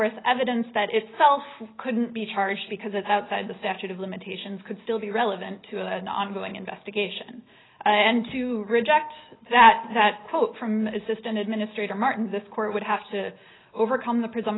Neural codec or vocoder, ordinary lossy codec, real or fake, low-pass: none; AAC, 16 kbps; real; 7.2 kHz